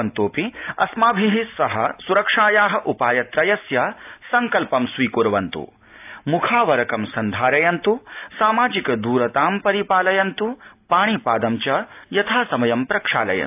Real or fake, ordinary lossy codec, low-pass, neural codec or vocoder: real; AAC, 32 kbps; 3.6 kHz; none